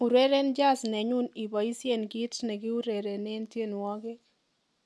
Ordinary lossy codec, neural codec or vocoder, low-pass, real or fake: none; none; none; real